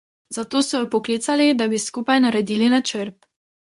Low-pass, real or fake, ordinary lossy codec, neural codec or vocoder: 10.8 kHz; fake; MP3, 64 kbps; codec, 24 kHz, 0.9 kbps, WavTokenizer, medium speech release version 2